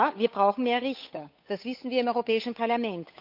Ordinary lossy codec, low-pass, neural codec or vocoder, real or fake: none; 5.4 kHz; codec, 16 kHz, 8 kbps, FunCodec, trained on Chinese and English, 25 frames a second; fake